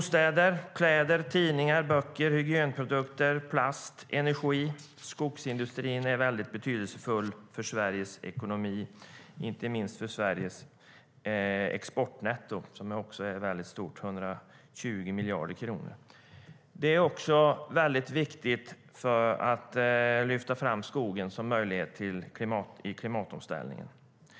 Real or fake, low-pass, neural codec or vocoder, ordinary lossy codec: real; none; none; none